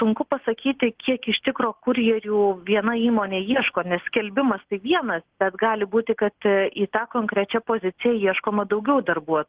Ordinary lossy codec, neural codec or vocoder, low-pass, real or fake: Opus, 16 kbps; none; 3.6 kHz; real